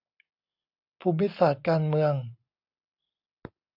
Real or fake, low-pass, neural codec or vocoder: real; 5.4 kHz; none